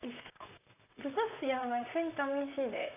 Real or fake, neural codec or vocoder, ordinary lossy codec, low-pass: fake; vocoder, 44.1 kHz, 128 mel bands, Pupu-Vocoder; none; 3.6 kHz